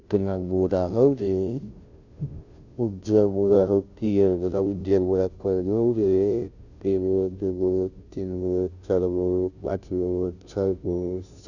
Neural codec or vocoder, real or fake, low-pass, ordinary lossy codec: codec, 16 kHz, 0.5 kbps, FunCodec, trained on Chinese and English, 25 frames a second; fake; 7.2 kHz; none